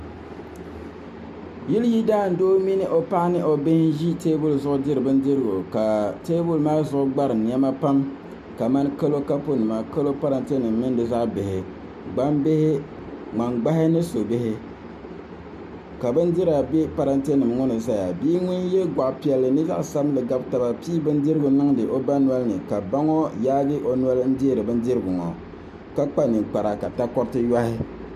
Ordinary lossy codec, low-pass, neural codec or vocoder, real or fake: MP3, 96 kbps; 14.4 kHz; none; real